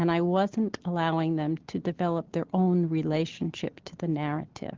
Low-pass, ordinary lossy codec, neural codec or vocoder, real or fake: 7.2 kHz; Opus, 16 kbps; none; real